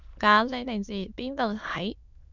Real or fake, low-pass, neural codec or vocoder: fake; 7.2 kHz; autoencoder, 22.05 kHz, a latent of 192 numbers a frame, VITS, trained on many speakers